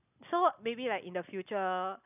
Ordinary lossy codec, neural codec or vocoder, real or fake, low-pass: none; none; real; 3.6 kHz